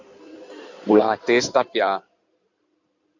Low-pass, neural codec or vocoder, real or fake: 7.2 kHz; codec, 16 kHz in and 24 kHz out, 2.2 kbps, FireRedTTS-2 codec; fake